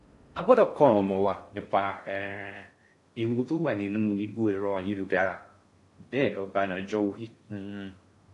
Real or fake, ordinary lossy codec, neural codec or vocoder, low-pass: fake; AAC, 48 kbps; codec, 16 kHz in and 24 kHz out, 0.6 kbps, FocalCodec, streaming, 2048 codes; 10.8 kHz